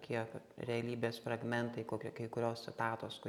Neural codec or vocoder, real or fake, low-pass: none; real; 19.8 kHz